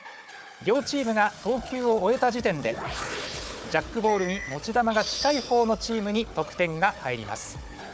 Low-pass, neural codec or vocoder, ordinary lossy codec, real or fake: none; codec, 16 kHz, 4 kbps, FunCodec, trained on Chinese and English, 50 frames a second; none; fake